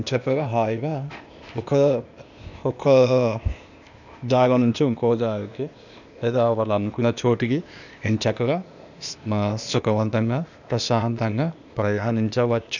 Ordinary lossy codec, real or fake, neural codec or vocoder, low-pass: none; fake; codec, 16 kHz, 0.8 kbps, ZipCodec; 7.2 kHz